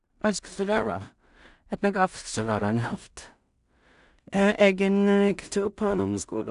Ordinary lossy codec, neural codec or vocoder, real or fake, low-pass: none; codec, 16 kHz in and 24 kHz out, 0.4 kbps, LongCat-Audio-Codec, two codebook decoder; fake; 10.8 kHz